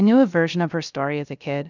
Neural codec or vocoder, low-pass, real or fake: codec, 16 kHz, 0.3 kbps, FocalCodec; 7.2 kHz; fake